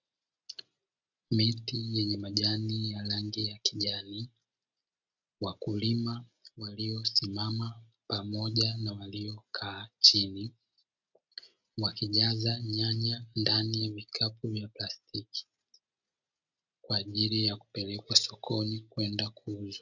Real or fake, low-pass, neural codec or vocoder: real; 7.2 kHz; none